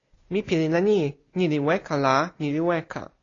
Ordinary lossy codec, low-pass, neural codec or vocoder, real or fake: AAC, 32 kbps; 7.2 kHz; none; real